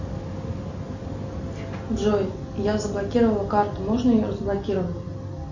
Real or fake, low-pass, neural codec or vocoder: real; 7.2 kHz; none